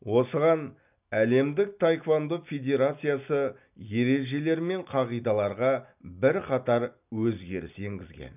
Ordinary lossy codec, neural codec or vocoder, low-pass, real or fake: none; none; 3.6 kHz; real